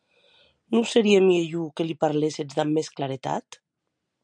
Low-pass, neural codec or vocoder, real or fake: 9.9 kHz; none; real